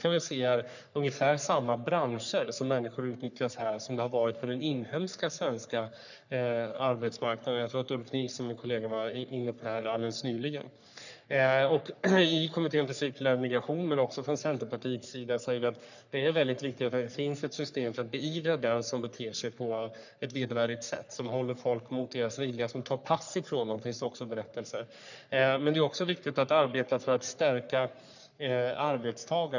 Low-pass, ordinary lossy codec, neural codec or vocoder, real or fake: 7.2 kHz; none; codec, 44.1 kHz, 3.4 kbps, Pupu-Codec; fake